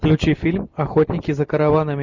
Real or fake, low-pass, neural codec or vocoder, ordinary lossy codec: real; 7.2 kHz; none; Opus, 64 kbps